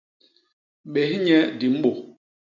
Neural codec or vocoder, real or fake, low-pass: none; real; 7.2 kHz